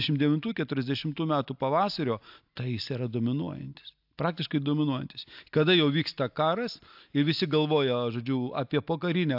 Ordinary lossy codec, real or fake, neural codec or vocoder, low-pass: AAC, 48 kbps; real; none; 5.4 kHz